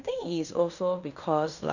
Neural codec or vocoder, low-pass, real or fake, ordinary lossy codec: codec, 16 kHz in and 24 kHz out, 0.9 kbps, LongCat-Audio-Codec, fine tuned four codebook decoder; 7.2 kHz; fake; none